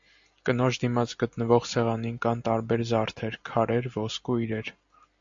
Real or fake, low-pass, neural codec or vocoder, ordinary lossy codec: real; 7.2 kHz; none; MP3, 64 kbps